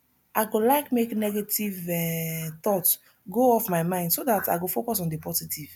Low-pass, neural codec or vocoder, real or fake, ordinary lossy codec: none; none; real; none